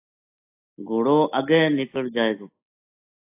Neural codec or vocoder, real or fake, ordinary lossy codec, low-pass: none; real; AAC, 24 kbps; 3.6 kHz